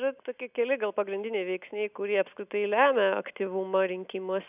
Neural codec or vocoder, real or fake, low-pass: none; real; 3.6 kHz